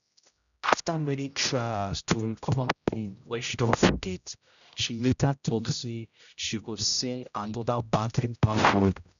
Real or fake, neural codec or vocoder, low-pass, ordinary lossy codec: fake; codec, 16 kHz, 0.5 kbps, X-Codec, HuBERT features, trained on general audio; 7.2 kHz; none